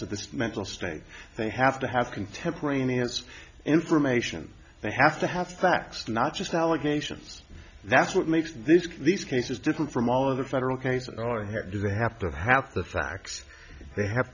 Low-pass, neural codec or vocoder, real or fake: 7.2 kHz; none; real